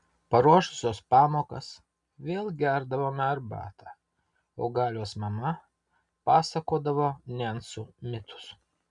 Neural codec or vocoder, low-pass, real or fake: none; 10.8 kHz; real